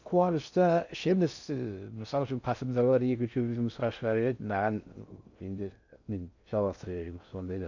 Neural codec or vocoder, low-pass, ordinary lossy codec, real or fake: codec, 16 kHz in and 24 kHz out, 0.6 kbps, FocalCodec, streaming, 2048 codes; 7.2 kHz; none; fake